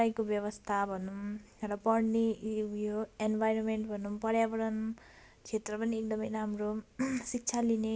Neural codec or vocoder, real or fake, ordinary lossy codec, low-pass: none; real; none; none